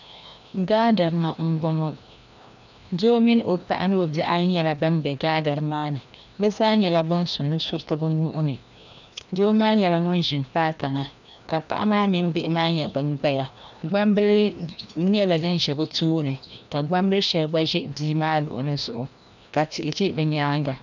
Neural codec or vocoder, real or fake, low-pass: codec, 16 kHz, 1 kbps, FreqCodec, larger model; fake; 7.2 kHz